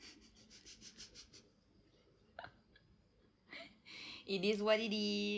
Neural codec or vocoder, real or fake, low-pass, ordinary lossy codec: none; real; none; none